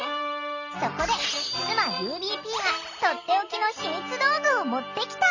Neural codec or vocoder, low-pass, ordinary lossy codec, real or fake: none; 7.2 kHz; none; real